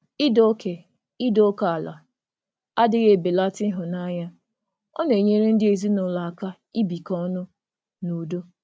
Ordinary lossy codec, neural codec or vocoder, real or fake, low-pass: none; none; real; none